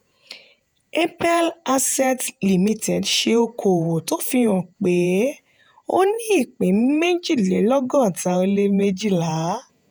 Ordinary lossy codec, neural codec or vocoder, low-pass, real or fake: none; vocoder, 48 kHz, 128 mel bands, Vocos; none; fake